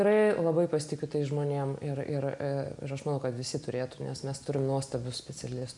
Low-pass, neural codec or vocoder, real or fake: 10.8 kHz; none; real